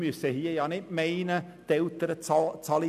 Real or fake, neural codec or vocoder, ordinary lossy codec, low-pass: real; none; none; 14.4 kHz